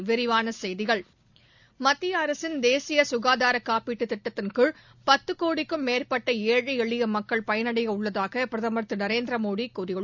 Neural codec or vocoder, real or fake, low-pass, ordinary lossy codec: none; real; 7.2 kHz; none